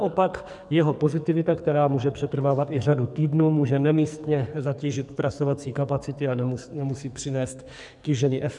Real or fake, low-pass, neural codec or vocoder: fake; 10.8 kHz; codec, 44.1 kHz, 2.6 kbps, SNAC